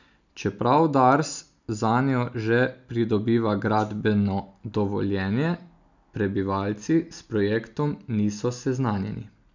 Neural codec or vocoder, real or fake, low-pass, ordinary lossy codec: none; real; 7.2 kHz; none